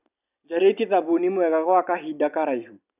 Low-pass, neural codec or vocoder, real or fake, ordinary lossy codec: 3.6 kHz; none; real; none